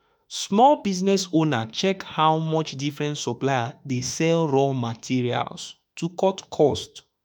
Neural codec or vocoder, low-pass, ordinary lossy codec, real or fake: autoencoder, 48 kHz, 32 numbers a frame, DAC-VAE, trained on Japanese speech; none; none; fake